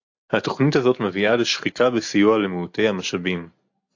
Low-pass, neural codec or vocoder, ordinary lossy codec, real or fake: 7.2 kHz; none; AAC, 48 kbps; real